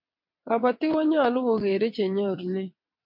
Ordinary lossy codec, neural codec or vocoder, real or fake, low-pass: MP3, 48 kbps; vocoder, 22.05 kHz, 80 mel bands, WaveNeXt; fake; 5.4 kHz